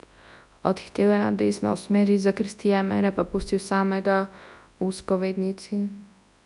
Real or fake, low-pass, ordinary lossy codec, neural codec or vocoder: fake; 10.8 kHz; none; codec, 24 kHz, 0.9 kbps, WavTokenizer, large speech release